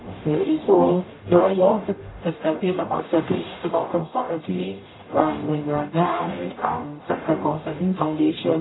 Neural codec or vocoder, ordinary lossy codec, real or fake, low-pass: codec, 44.1 kHz, 0.9 kbps, DAC; AAC, 16 kbps; fake; 7.2 kHz